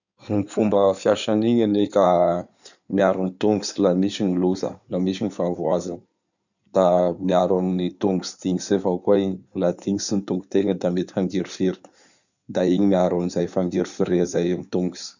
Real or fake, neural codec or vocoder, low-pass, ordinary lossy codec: fake; codec, 16 kHz in and 24 kHz out, 2.2 kbps, FireRedTTS-2 codec; 7.2 kHz; none